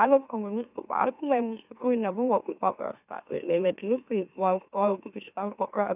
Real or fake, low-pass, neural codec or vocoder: fake; 3.6 kHz; autoencoder, 44.1 kHz, a latent of 192 numbers a frame, MeloTTS